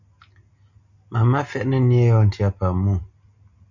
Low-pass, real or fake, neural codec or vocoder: 7.2 kHz; real; none